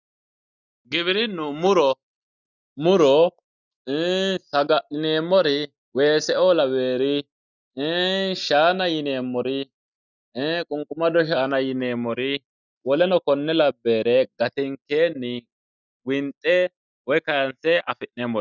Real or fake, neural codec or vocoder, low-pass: real; none; 7.2 kHz